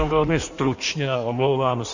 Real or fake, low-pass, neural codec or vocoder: fake; 7.2 kHz; codec, 16 kHz in and 24 kHz out, 1.1 kbps, FireRedTTS-2 codec